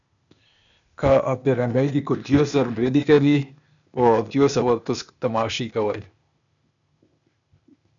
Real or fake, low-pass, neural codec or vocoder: fake; 7.2 kHz; codec, 16 kHz, 0.8 kbps, ZipCodec